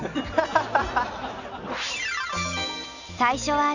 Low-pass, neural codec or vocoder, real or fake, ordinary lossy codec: 7.2 kHz; none; real; none